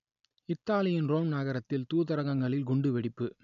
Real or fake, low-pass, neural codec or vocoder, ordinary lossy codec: real; 7.2 kHz; none; none